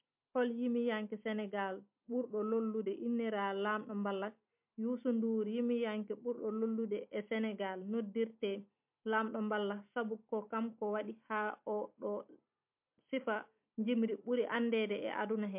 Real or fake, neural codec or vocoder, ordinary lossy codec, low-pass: real; none; MP3, 32 kbps; 3.6 kHz